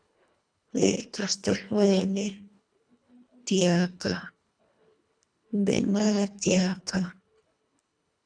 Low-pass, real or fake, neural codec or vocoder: 9.9 kHz; fake; codec, 24 kHz, 1.5 kbps, HILCodec